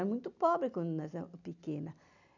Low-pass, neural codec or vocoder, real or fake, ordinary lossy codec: 7.2 kHz; none; real; none